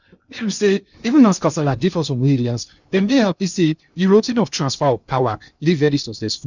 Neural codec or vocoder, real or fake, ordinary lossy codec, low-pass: codec, 16 kHz in and 24 kHz out, 0.6 kbps, FocalCodec, streaming, 2048 codes; fake; none; 7.2 kHz